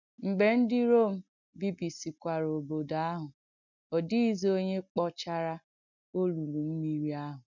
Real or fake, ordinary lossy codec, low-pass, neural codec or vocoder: real; none; 7.2 kHz; none